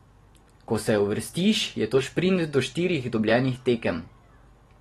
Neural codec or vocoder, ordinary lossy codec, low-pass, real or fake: none; AAC, 32 kbps; 19.8 kHz; real